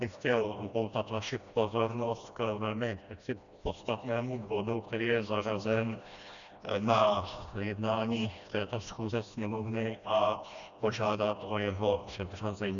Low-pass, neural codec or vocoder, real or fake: 7.2 kHz; codec, 16 kHz, 1 kbps, FreqCodec, smaller model; fake